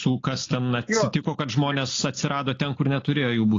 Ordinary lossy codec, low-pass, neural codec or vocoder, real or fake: AAC, 32 kbps; 7.2 kHz; none; real